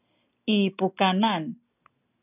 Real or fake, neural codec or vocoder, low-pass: real; none; 3.6 kHz